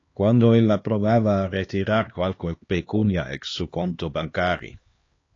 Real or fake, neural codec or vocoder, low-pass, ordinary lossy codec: fake; codec, 16 kHz, 2 kbps, X-Codec, HuBERT features, trained on LibriSpeech; 7.2 kHz; AAC, 32 kbps